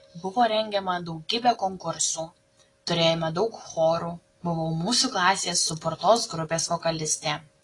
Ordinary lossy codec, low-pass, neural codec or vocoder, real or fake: AAC, 32 kbps; 10.8 kHz; none; real